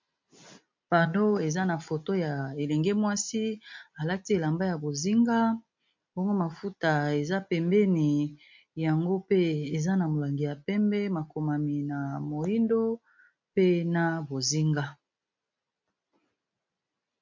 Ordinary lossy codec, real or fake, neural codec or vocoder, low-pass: MP3, 48 kbps; real; none; 7.2 kHz